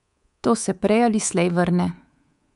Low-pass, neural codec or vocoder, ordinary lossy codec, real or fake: 10.8 kHz; codec, 24 kHz, 3.1 kbps, DualCodec; none; fake